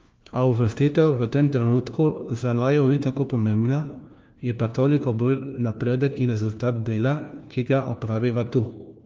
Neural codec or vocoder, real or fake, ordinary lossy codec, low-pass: codec, 16 kHz, 1 kbps, FunCodec, trained on LibriTTS, 50 frames a second; fake; Opus, 24 kbps; 7.2 kHz